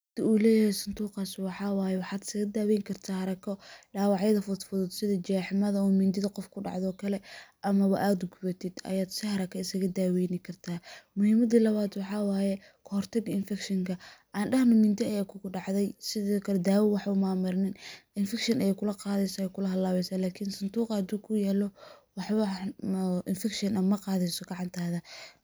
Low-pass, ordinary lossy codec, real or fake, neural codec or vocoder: none; none; real; none